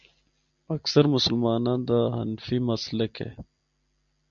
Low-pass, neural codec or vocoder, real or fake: 7.2 kHz; none; real